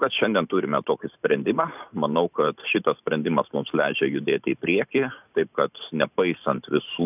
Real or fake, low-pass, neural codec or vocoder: fake; 3.6 kHz; vocoder, 44.1 kHz, 128 mel bands every 512 samples, BigVGAN v2